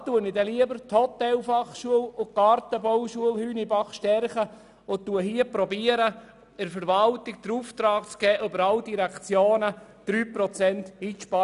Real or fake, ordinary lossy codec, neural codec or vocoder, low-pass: real; none; none; 10.8 kHz